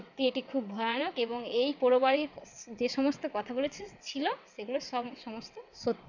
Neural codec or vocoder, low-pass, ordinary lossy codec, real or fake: vocoder, 22.05 kHz, 80 mel bands, WaveNeXt; 7.2 kHz; none; fake